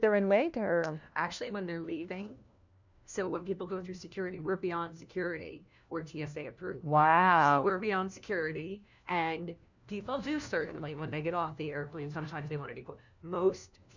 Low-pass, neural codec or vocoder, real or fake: 7.2 kHz; codec, 16 kHz, 1 kbps, FunCodec, trained on LibriTTS, 50 frames a second; fake